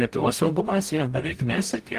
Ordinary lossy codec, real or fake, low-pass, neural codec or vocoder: Opus, 24 kbps; fake; 14.4 kHz; codec, 44.1 kHz, 0.9 kbps, DAC